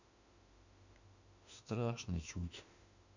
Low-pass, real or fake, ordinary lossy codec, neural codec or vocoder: 7.2 kHz; fake; none; autoencoder, 48 kHz, 32 numbers a frame, DAC-VAE, trained on Japanese speech